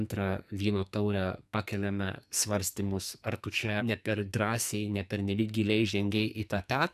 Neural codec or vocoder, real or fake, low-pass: codec, 44.1 kHz, 2.6 kbps, SNAC; fake; 14.4 kHz